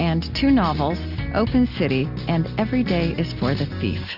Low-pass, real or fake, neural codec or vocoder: 5.4 kHz; real; none